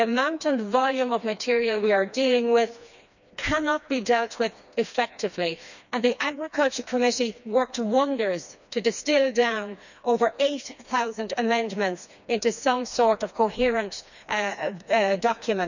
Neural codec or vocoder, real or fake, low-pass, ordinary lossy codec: codec, 16 kHz, 2 kbps, FreqCodec, smaller model; fake; 7.2 kHz; none